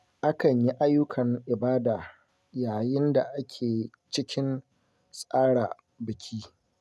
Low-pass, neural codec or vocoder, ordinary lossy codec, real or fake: none; none; none; real